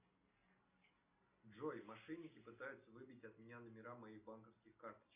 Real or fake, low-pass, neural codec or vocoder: real; 3.6 kHz; none